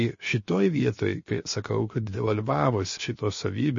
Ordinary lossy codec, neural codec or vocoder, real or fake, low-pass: MP3, 32 kbps; codec, 16 kHz, 0.7 kbps, FocalCodec; fake; 7.2 kHz